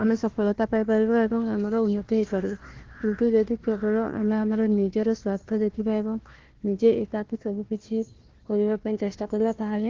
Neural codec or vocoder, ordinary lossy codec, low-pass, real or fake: codec, 16 kHz, 1 kbps, FunCodec, trained on Chinese and English, 50 frames a second; Opus, 16 kbps; 7.2 kHz; fake